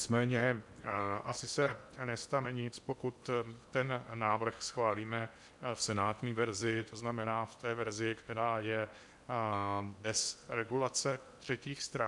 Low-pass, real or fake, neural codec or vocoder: 10.8 kHz; fake; codec, 16 kHz in and 24 kHz out, 0.8 kbps, FocalCodec, streaming, 65536 codes